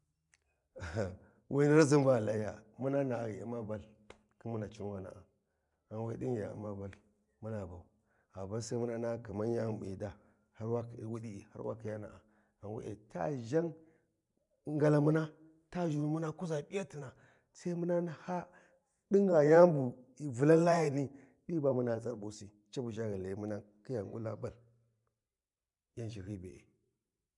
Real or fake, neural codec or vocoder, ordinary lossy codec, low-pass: fake; vocoder, 44.1 kHz, 128 mel bands every 512 samples, BigVGAN v2; none; 10.8 kHz